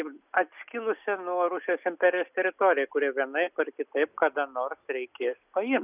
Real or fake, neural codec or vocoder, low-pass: real; none; 3.6 kHz